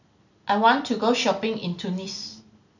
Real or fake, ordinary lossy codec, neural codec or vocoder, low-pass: real; none; none; 7.2 kHz